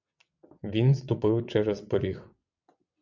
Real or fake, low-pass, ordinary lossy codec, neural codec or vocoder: fake; 7.2 kHz; MP3, 64 kbps; vocoder, 22.05 kHz, 80 mel bands, WaveNeXt